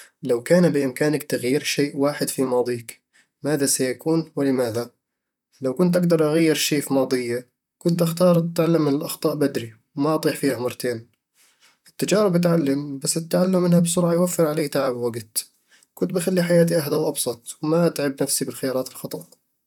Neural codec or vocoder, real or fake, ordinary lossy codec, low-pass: vocoder, 44.1 kHz, 128 mel bands, Pupu-Vocoder; fake; none; 19.8 kHz